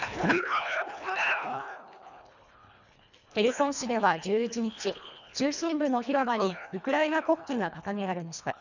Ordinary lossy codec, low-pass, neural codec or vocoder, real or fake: none; 7.2 kHz; codec, 24 kHz, 1.5 kbps, HILCodec; fake